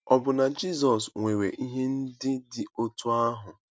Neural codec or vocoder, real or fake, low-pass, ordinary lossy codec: none; real; none; none